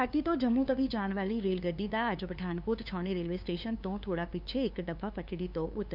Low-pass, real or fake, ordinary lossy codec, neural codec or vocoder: 5.4 kHz; fake; none; codec, 16 kHz, 2 kbps, FunCodec, trained on LibriTTS, 25 frames a second